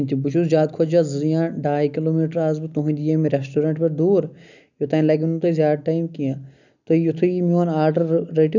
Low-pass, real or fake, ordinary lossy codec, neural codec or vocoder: 7.2 kHz; real; none; none